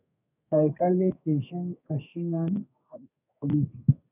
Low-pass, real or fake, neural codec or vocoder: 3.6 kHz; fake; codec, 32 kHz, 1.9 kbps, SNAC